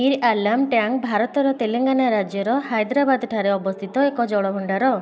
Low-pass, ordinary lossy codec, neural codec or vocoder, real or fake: none; none; none; real